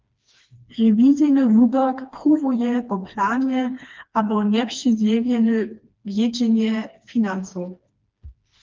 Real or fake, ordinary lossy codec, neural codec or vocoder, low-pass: fake; Opus, 32 kbps; codec, 16 kHz, 2 kbps, FreqCodec, smaller model; 7.2 kHz